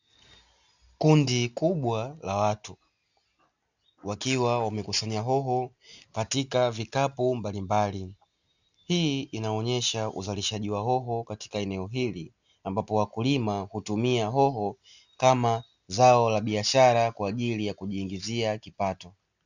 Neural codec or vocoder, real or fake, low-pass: none; real; 7.2 kHz